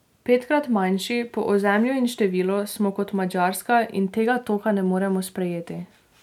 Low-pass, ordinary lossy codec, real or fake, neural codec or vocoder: 19.8 kHz; none; real; none